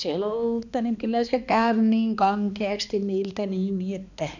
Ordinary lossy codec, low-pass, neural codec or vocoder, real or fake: none; 7.2 kHz; codec, 16 kHz, 2 kbps, X-Codec, HuBERT features, trained on balanced general audio; fake